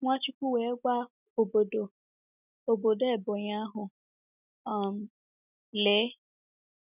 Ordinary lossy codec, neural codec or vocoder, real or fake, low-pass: none; none; real; 3.6 kHz